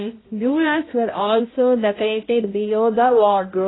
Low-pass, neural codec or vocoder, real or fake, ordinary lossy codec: 7.2 kHz; codec, 16 kHz, 0.5 kbps, X-Codec, HuBERT features, trained on balanced general audio; fake; AAC, 16 kbps